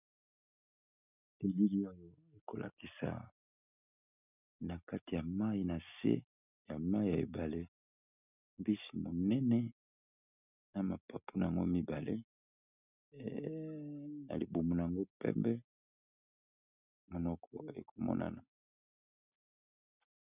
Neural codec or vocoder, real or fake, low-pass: none; real; 3.6 kHz